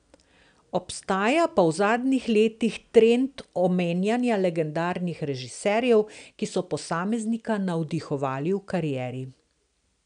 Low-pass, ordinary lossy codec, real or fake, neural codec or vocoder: 9.9 kHz; none; real; none